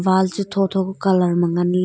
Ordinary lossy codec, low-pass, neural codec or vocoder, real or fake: none; none; none; real